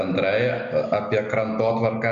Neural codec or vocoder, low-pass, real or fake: none; 7.2 kHz; real